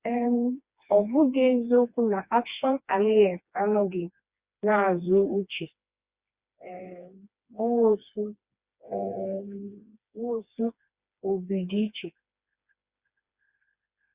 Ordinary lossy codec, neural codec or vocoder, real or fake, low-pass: Opus, 64 kbps; codec, 16 kHz, 2 kbps, FreqCodec, smaller model; fake; 3.6 kHz